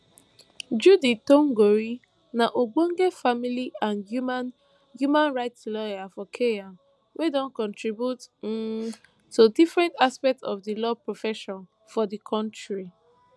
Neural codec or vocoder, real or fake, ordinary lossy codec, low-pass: none; real; none; none